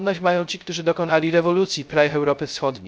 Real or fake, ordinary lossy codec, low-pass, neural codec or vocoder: fake; none; none; codec, 16 kHz, 0.3 kbps, FocalCodec